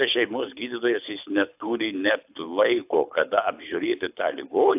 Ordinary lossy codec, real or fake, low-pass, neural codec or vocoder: AAC, 32 kbps; fake; 3.6 kHz; codec, 24 kHz, 6 kbps, HILCodec